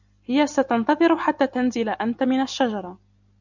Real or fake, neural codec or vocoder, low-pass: real; none; 7.2 kHz